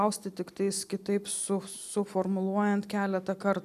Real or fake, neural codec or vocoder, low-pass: real; none; 14.4 kHz